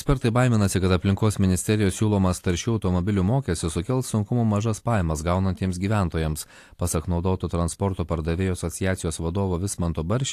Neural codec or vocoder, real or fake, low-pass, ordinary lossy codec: none; real; 14.4 kHz; AAC, 64 kbps